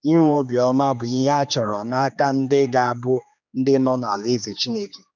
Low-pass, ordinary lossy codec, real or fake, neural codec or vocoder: 7.2 kHz; none; fake; codec, 16 kHz, 2 kbps, X-Codec, HuBERT features, trained on general audio